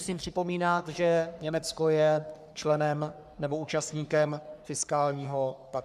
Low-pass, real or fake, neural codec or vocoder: 14.4 kHz; fake; codec, 44.1 kHz, 3.4 kbps, Pupu-Codec